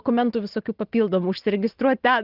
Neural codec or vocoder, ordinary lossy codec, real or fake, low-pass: none; Opus, 16 kbps; real; 5.4 kHz